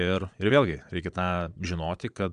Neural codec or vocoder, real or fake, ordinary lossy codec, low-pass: vocoder, 22.05 kHz, 80 mel bands, Vocos; fake; AAC, 96 kbps; 9.9 kHz